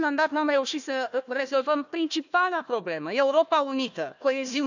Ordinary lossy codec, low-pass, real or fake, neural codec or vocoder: none; 7.2 kHz; fake; codec, 16 kHz, 1 kbps, FunCodec, trained on Chinese and English, 50 frames a second